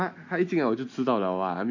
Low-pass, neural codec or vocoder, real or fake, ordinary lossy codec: 7.2 kHz; none; real; none